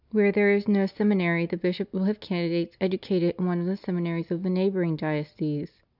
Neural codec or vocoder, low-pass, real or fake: none; 5.4 kHz; real